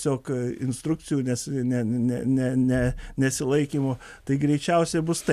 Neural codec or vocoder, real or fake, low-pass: none; real; 14.4 kHz